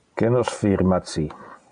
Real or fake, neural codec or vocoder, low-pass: real; none; 9.9 kHz